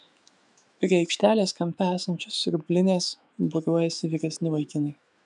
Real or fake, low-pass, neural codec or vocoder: fake; 10.8 kHz; autoencoder, 48 kHz, 128 numbers a frame, DAC-VAE, trained on Japanese speech